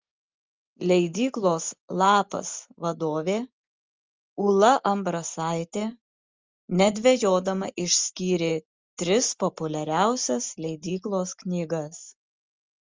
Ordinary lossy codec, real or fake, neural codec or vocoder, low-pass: Opus, 32 kbps; real; none; 7.2 kHz